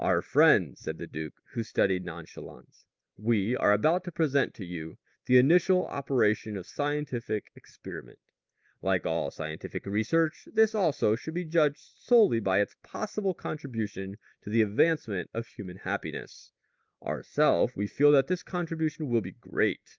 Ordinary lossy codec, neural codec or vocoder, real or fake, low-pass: Opus, 24 kbps; none; real; 7.2 kHz